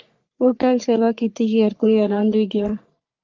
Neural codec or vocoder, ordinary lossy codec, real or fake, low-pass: codec, 44.1 kHz, 3.4 kbps, Pupu-Codec; Opus, 24 kbps; fake; 7.2 kHz